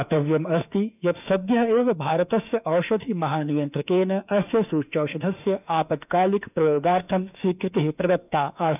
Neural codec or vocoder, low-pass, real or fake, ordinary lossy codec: autoencoder, 48 kHz, 32 numbers a frame, DAC-VAE, trained on Japanese speech; 3.6 kHz; fake; none